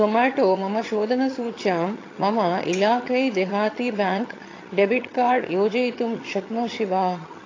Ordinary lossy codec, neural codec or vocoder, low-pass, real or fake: AAC, 32 kbps; vocoder, 22.05 kHz, 80 mel bands, HiFi-GAN; 7.2 kHz; fake